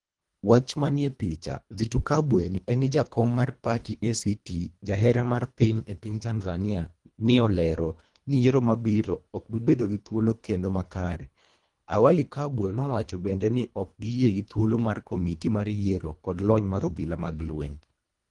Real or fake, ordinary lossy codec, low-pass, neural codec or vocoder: fake; Opus, 24 kbps; 10.8 kHz; codec, 24 kHz, 1.5 kbps, HILCodec